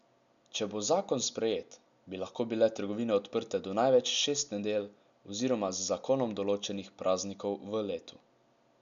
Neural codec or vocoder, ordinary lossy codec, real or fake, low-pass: none; none; real; 7.2 kHz